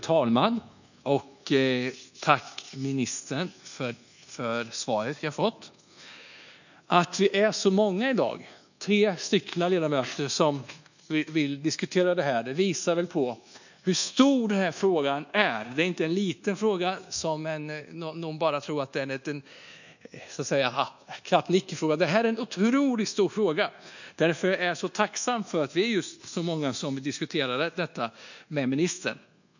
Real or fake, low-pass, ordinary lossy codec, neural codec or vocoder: fake; 7.2 kHz; none; codec, 24 kHz, 1.2 kbps, DualCodec